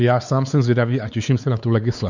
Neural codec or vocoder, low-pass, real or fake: codec, 16 kHz, 4 kbps, X-Codec, WavLM features, trained on Multilingual LibriSpeech; 7.2 kHz; fake